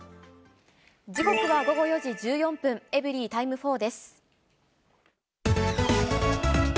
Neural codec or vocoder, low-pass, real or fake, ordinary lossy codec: none; none; real; none